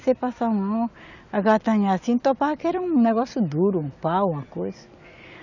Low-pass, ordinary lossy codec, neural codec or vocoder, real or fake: 7.2 kHz; none; none; real